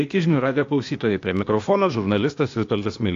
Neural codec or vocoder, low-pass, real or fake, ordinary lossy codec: codec, 16 kHz, 0.8 kbps, ZipCodec; 7.2 kHz; fake; AAC, 48 kbps